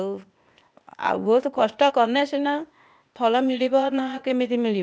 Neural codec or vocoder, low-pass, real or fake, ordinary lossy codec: codec, 16 kHz, 0.8 kbps, ZipCodec; none; fake; none